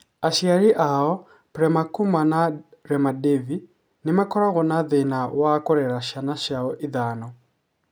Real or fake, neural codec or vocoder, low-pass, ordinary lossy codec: real; none; none; none